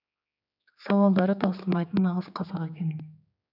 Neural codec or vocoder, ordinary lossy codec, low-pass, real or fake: codec, 16 kHz, 4 kbps, X-Codec, HuBERT features, trained on general audio; none; 5.4 kHz; fake